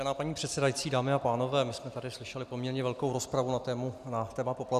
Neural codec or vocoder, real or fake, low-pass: none; real; 14.4 kHz